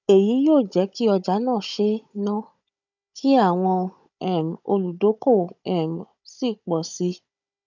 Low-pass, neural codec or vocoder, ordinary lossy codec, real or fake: 7.2 kHz; codec, 16 kHz, 16 kbps, FunCodec, trained on Chinese and English, 50 frames a second; none; fake